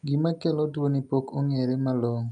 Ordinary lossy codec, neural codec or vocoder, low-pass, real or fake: none; none; 10.8 kHz; real